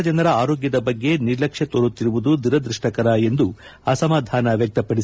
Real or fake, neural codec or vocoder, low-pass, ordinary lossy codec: real; none; none; none